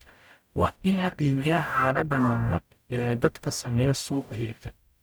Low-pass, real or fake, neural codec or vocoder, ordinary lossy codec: none; fake; codec, 44.1 kHz, 0.9 kbps, DAC; none